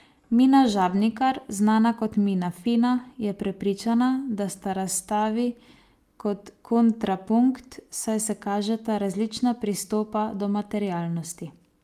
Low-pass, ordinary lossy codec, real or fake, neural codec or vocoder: 14.4 kHz; Opus, 32 kbps; real; none